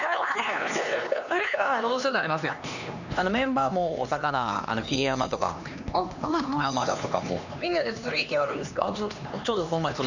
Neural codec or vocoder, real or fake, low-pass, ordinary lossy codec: codec, 16 kHz, 2 kbps, X-Codec, HuBERT features, trained on LibriSpeech; fake; 7.2 kHz; none